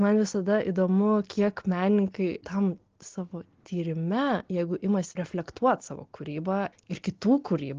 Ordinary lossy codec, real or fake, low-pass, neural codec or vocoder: Opus, 16 kbps; real; 7.2 kHz; none